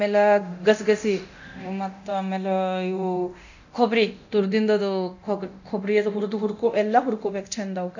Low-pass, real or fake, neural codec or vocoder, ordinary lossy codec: 7.2 kHz; fake; codec, 24 kHz, 0.9 kbps, DualCodec; none